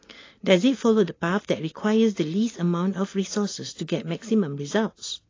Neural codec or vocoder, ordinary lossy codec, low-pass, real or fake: none; AAC, 32 kbps; 7.2 kHz; real